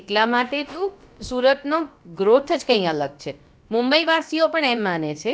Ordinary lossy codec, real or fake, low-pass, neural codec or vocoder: none; fake; none; codec, 16 kHz, about 1 kbps, DyCAST, with the encoder's durations